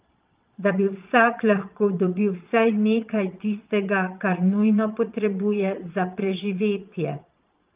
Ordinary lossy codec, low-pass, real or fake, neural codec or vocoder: Opus, 32 kbps; 3.6 kHz; fake; vocoder, 22.05 kHz, 80 mel bands, Vocos